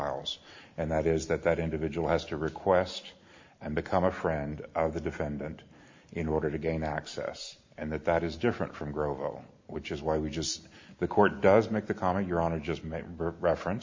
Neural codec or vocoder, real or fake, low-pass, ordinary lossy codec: none; real; 7.2 kHz; MP3, 48 kbps